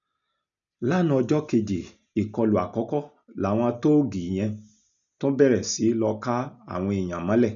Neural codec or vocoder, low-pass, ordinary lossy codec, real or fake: none; 7.2 kHz; none; real